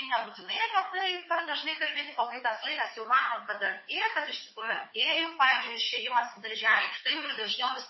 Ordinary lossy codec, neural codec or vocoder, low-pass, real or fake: MP3, 24 kbps; codec, 16 kHz, 4 kbps, FunCodec, trained on LibriTTS, 50 frames a second; 7.2 kHz; fake